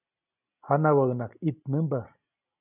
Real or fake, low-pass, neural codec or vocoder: real; 3.6 kHz; none